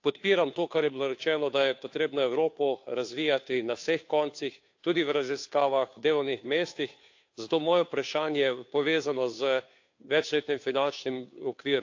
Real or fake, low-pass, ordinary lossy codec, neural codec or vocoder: fake; 7.2 kHz; AAC, 48 kbps; codec, 16 kHz, 2 kbps, FunCodec, trained on Chinese and English, 25 frames a second